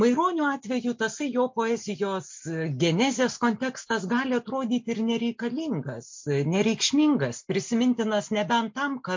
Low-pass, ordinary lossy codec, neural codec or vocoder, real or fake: 7.2 kHz; MP3, 48 kbps; none; real